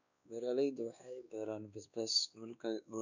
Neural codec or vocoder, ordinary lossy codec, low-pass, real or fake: codec, 16 kHz, 2 kbps, X-Codec, WavLM features, trained on Multilingual LibriSpeech; none; 7.2 kHz; fake